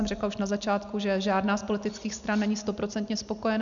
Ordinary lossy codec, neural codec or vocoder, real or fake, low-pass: MP3, 96 kbps; none; real; 7.2 kHz